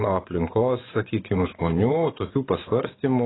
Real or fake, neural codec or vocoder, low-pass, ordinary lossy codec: fake; vocoder, 24 kHz, 100 mel bands, Vocos; 7.2 kHz; AAC, 16 kbps